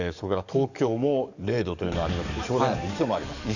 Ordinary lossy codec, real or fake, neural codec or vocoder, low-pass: none; fake; codec, 44.1 kHz, 7.8 kbps, DAC; 7.2 kHz